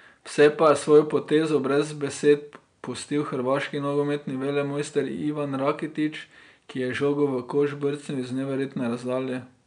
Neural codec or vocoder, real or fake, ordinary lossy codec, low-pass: none; real; MP3, 96 kbps; 9.9 kHz